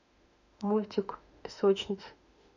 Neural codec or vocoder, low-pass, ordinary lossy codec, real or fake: autoencoder, 48 kHz, 32 numbers a frame, DAC-VAE, trained on Japanese speech; 7.2 kHz; none; fake